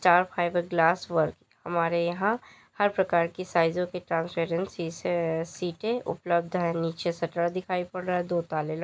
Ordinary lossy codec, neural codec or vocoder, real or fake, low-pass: none; none; real; none